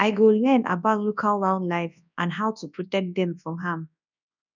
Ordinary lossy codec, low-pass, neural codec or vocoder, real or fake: none; 7.2 kHz; codec, 24 kHz, 0.9 kbps, WavTokenizer, large speech release; fake